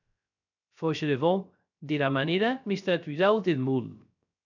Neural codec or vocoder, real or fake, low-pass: codec, 16 kHz, 0.3 kbps, FocalCodec; fake; 7.2 kHz